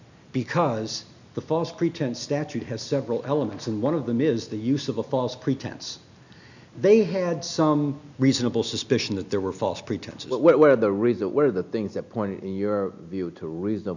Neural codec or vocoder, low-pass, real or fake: none; 7.2 kHz; real